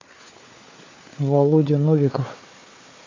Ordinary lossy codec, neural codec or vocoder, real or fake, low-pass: AAC, 32 kbps; codec, 16 kHz, 4 kbps, FunCodec, trained on Chinese and English, 50 frames a second; fake; 7.2 kHz